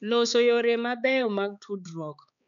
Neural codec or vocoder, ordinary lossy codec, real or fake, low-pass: codec, 16 kHz, 4 kbps, X-Codec, HuBERT features, trained on balanced general audio; none; fake; 7.2 kHz